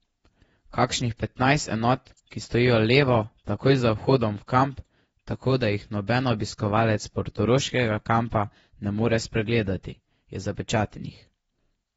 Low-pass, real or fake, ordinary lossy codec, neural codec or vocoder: 19.8 kHz; fake; AAC, 24 kbps; vocoder, 48 kHz, 128 mel bands, Vocos